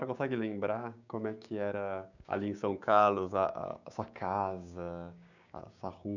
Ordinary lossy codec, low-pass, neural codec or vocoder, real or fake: none; 7.2 kHz; codec, 16 kHz, 6 kbps, DAC; fake